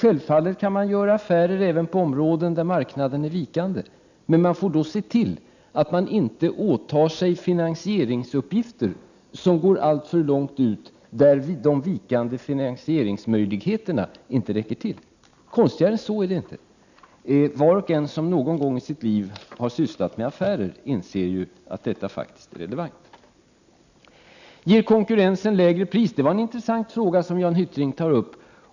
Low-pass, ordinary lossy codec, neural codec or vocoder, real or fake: 7.2 kHz; none; none; real